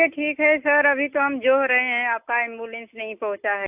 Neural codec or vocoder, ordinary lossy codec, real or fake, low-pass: none; AAC, 32 kbps; real; 3.6 kHz